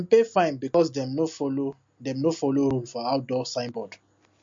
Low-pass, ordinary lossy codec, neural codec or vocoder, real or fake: 7.2 kHz; MP3, 48 kbps; none; real